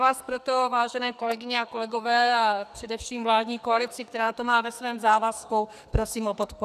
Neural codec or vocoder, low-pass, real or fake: codec, 44.1 kHz, 2.6 kbps, SNAC; 14.4 kHz; fake